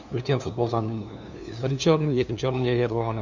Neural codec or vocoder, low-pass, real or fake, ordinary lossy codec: codec, 16 kHz, 2 kbps, FunCodec, trained on LibriTTS, 25 frames a second; 7.2 kHz; fake; none